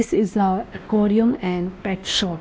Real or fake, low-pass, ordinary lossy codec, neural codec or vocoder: fake; none; none; codec, 16 kHz, 1 kbps, X-Codec, WavLM features, trained on Multilingual LibriSpeech